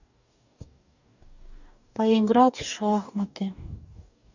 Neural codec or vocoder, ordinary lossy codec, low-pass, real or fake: codec, 44.1 kHz, 2.6 kbps, DAC; none; 7.2 kHz; fake